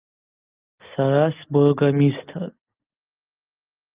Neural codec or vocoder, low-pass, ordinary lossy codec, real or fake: none; 3.6 kHz; Opus, 24 kbps; real